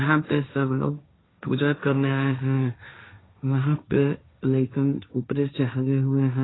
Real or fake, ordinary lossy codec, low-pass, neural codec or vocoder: fake; AAC, 16 kbps; 7.2 kHz; codec, 16 kHz, 1.1 kbps, Voila-Tokenizer